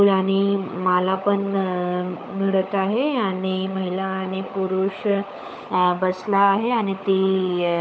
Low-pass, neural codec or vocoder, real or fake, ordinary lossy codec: none; codec, 16 kHz, 16 kbps, FunCodec, trained on LibriTTS, 50 frames a second; fake; none